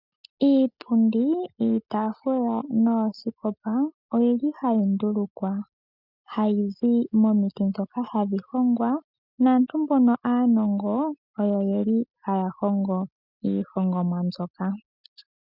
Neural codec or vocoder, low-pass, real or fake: none; 5.4 kHz; real